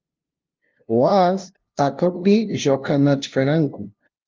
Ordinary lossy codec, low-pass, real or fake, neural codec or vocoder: Opus, 32 kbps; 7.2 kHz; fake; codec, 16 kHz, 0.5 kbps, FunCodec, trained on LibriTTS, 25 frames a second